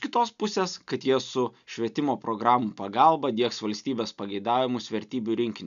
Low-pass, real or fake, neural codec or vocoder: 7.2 kHz; real; none